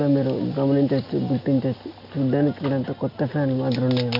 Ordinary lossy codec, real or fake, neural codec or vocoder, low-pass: none; real; none; 5.4 kHz